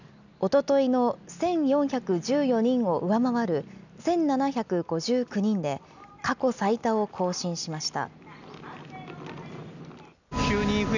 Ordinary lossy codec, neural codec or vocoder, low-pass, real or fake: none; none; 7.2 kHz; real